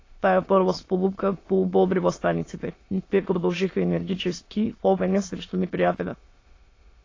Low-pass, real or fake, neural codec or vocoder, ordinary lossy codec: 7.2 kHz; fake; autoencoder, 22.05 kHz, a latent of 192 numbers a frame, VITS, trained on many speakers; AAC, 32 kbps